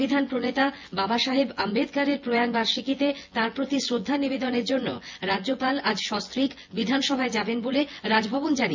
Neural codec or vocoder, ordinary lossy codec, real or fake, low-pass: vocoder, 24 kHz, 100 mel bands, Vocos; MP3, 48 kbps; fake; 7.2 kHz